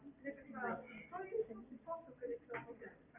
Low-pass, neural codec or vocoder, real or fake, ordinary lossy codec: 3.6 kHz; none; real; Opus, 64 kbps